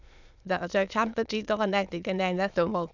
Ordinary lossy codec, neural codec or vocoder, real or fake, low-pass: none; autoencoder, 22.05 kHz, a latent of 192 numbers a frame, VITS, trained on many speakers; fake; 7.2 kHz